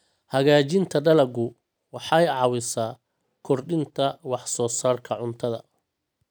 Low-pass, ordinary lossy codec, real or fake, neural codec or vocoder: none; none; real; none